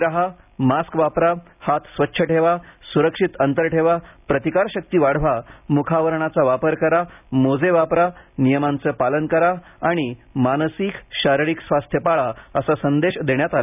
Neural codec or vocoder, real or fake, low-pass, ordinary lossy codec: none; real; 3.6 kHz; none